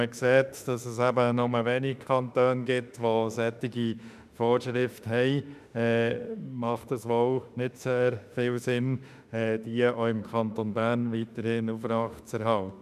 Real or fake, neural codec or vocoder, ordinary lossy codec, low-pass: fake; autoencoder, 48 kHz, 32 numbers a frame, DAC-VAE, trained on Japanese speech; none; 14.4 kHz